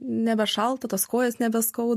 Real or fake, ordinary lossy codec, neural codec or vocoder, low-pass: real; MP3, 64 kbps; none; 14.4 kHz